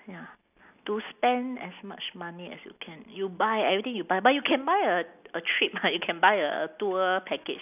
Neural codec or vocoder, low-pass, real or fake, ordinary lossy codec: none; 3.6 kHz; real; none